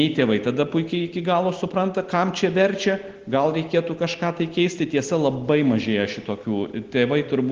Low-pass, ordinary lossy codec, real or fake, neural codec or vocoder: 7.2 kHz; Opus, 16 kbps; real; none